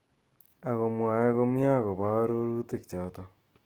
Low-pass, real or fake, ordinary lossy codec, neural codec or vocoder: 19.8 kHz; fake; Opus, 16 kbps; autoencoder, 48 kHz, 128 numbers a frame, DAC-VAE, trained on Japanese speech